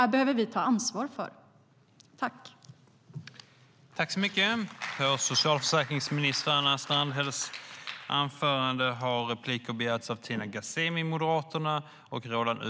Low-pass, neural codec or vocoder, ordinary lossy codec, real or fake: none; none; none; real